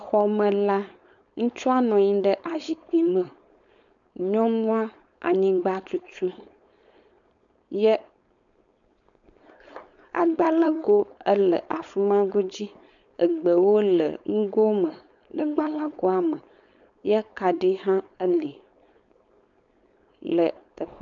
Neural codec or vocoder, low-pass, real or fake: codec, 16 kHz, 4.8 kbps, FACodec; 7.2 kHz; fake